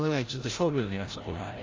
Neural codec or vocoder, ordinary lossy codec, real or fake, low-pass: codec, 16 kHz, 0.5 kbps, FreqCodec, larger model; Opus, 32 kbps; fake; 7.2 kHz